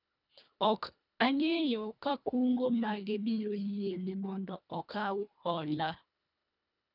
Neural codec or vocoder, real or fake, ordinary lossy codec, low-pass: codec, 24 kHz, 1.5 kbps, HILCodec; fake; none; 5.4 kHz